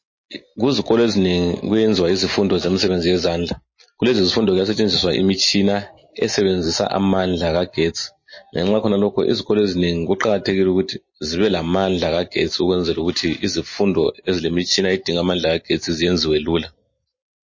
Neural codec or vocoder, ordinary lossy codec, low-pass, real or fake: none; MP3, 32 kbps; 7.2 kHz; real